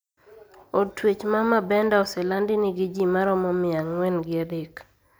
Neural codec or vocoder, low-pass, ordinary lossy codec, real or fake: none; none; none; real